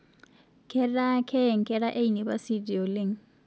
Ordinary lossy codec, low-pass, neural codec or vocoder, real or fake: none; none; none; real